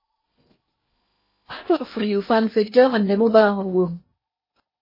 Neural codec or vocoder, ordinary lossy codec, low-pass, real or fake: codec, 16 kHz in and 24 kHz out, 0.8 kbps, FocalCodec, streaming, 65536 codes; MP3, 24 kbps; 5.4 kHz; fake